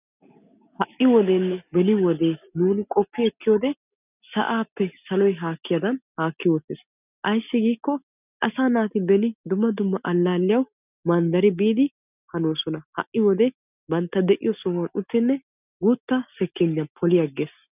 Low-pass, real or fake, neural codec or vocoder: 3.6 kHz; real; none